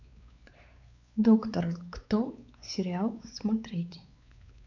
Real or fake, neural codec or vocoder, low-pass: fake; codec, 16 kHz, 4 kbps, X-Codec, WavLM features, trained on Multilingual LibriSpeech; 7.2 kHz